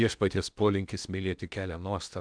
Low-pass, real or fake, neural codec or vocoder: 9.9 kHz; fake; codec, 16 kHz in and 24 kHz out, 0.8 kbps, FocalCodec, streaming, 65536 codes